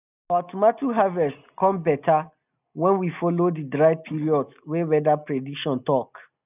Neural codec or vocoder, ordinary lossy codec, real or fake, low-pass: none; none; real; 3.6 kHz